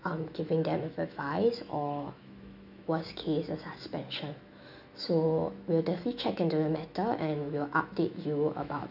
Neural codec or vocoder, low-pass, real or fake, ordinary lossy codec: autoencoder, 48 kHz, 128 numbers a frame, DAC-VAE, trained on Japanese speech; 5.4 kHz; fake; none